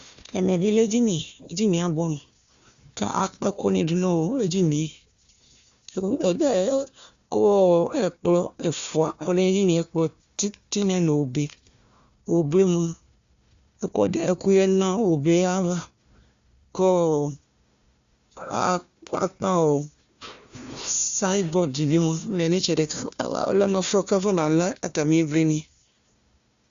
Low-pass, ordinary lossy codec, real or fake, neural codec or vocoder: 7.2 kHz; Opus, 64 kbps; fake; codec, 16 kHz, 1 kbps, FunCodec, trained on Chinese and English, 50 frames a second